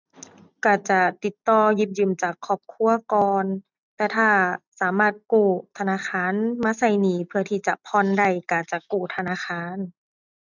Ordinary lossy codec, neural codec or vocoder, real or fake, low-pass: none; none; real; 7.2 kHz